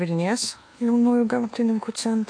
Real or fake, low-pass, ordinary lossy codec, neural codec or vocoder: fake; 9.9 kHz; AAC, 48 kbps; codec, 24 kHz, 1.2 kbps, DualCodec